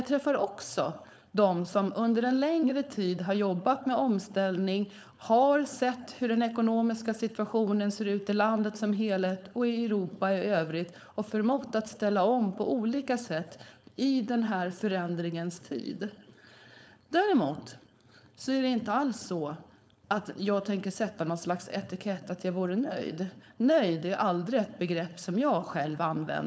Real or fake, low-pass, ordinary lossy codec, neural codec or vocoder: fake; none; none; codec, 16 kHz, 4.8 kbps, FACodec